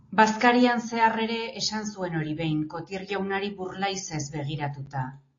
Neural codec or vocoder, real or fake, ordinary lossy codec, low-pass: none; real; AAC, 32 kbps; 7.2 kHz